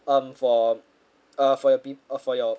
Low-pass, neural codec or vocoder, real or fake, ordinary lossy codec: none; none; real; none